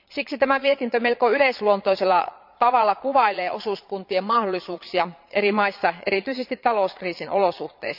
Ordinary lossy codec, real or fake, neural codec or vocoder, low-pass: none; fake; vocoder, 22.05 kHz, 80 mel bands, Vocos; 5.4 kHz